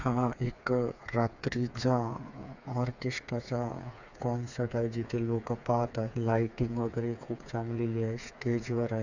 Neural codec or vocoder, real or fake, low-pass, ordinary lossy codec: codec, 16 kHz, 4 kbps, FreqCodec, smaller model; fake; 7.2 kHz; none